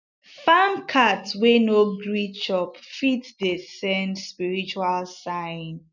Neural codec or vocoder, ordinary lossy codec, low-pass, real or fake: none; none; 7.2 kHz; real